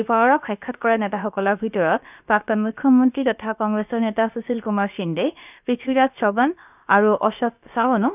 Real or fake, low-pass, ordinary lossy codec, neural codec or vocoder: fake; 3.6 kHz; none; codec, 16 kHz, about 1 kbps, DyCAST, with the encoder's durations